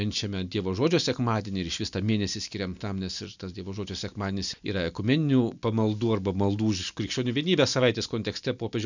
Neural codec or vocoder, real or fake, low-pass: none; real; 7.2 kHz